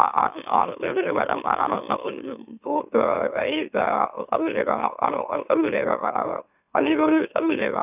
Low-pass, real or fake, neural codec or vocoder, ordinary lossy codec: 3.6 kHz; fake; autoencoder, 44.1 kHz, a latent of 192 numbers a frame, MeloTTS; none